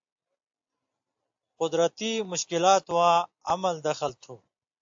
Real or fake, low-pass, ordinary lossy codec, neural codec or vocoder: real; 7.2 kHz; AAC, 64 kbps; none